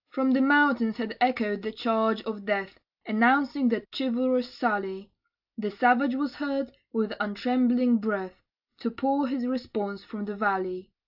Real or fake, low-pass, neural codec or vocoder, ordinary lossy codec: real; 5.4 kHz; none; AAC, 48 kbps